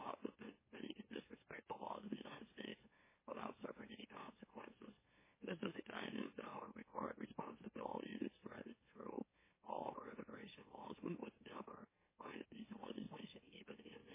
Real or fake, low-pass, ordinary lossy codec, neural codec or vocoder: fake; 3.6 kHz; MP3, 16 kbps; autoencoder, 44.1 kHz, a latent of 192 numbers a frame, MeloTTS